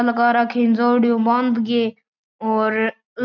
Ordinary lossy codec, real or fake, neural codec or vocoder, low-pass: none; real; none; 7.2 kHz